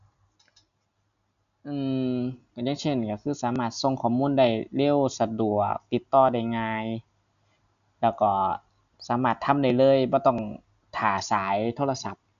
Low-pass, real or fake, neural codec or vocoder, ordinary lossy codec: 7.2 kHz; real; none; none